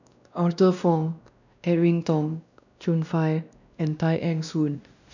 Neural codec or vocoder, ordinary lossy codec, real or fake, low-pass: codec, 16 kHz, 1 kbps, X-Codec, WavLM features, trained on Multilingual LibriSpeech; none; fake; 7.2 kHz